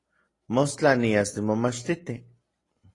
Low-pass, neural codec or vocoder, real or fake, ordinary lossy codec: 10.8 kHz; vocoder, 44.1 kHz, 128 mel bands every 256 samples, BigVGAN v2; fake; AAC, 32 kbps